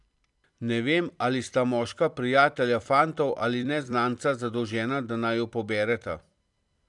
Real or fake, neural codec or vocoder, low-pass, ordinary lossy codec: fake; vocoder, 44.1 kHz, 128 mel bands every 256 samples, BigVGAN v2; 10.8 kHz; none